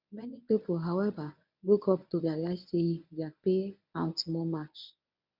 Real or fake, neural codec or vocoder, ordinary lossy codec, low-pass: fake; codec, 24 kHz, 0.9 kbps, WavTokenizer, medium speech release version 1; none; 5.4 kHz